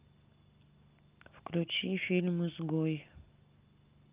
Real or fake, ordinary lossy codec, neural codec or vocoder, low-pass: real; Opus, 24 kbps; none; 3.6 kHz